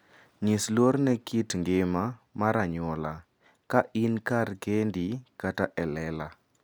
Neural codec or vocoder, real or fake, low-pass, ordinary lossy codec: none; real; none; none